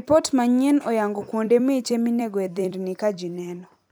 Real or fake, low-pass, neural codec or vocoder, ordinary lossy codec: fake; none; vocoder, 44.1 kHz, 128 mel bands every 256 samples, BigVGAN v2; none